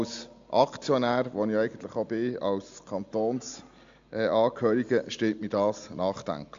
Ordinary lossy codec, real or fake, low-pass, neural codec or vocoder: AAC, 64 kbps; real; 7.2 kHz; none